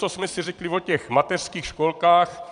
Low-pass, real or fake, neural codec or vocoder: 9.9 kHz; fake; vocoder, 22.05 kHz, 80 mel bands, Vocos